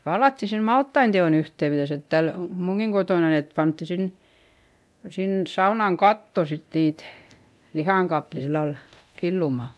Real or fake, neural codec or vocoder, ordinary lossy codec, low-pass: fake; codec, 24 kHz, 0.9 kbps, DualCodec; none; none